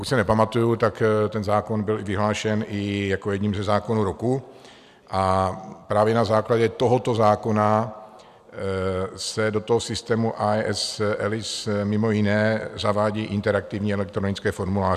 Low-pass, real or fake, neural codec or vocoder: 14.4 kHz; real; none